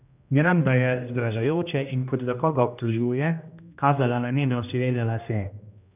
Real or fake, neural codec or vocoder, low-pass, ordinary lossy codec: fake; codec, 16 kHz, 1 kbps, X-Codec, HuBERT features, trained on general audio; 3.6 kHz; none